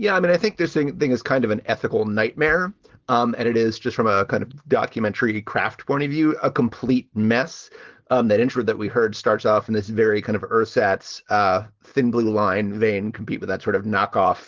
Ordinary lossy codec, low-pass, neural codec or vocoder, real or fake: Opus, 16 kbps; 7.2 kHz; none; real